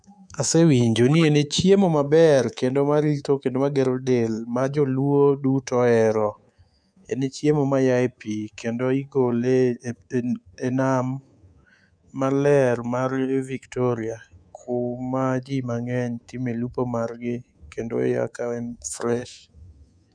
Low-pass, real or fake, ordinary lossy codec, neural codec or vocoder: 9.9 kHz; fake; none; codec, 24 kHz, 3.1 kbps, DualCodec